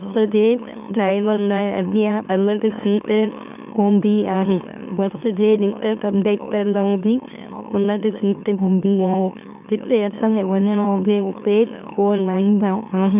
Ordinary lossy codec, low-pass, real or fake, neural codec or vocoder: none; 3.6 kHz; fake; autoencoder, 44.1 kHz, a latent of 192 numbers a frame, MeloTTS